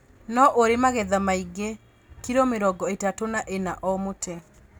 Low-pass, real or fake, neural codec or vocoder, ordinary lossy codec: none; real; none; none